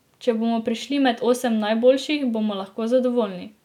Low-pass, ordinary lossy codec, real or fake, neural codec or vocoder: 19.8 kHz; none; real; none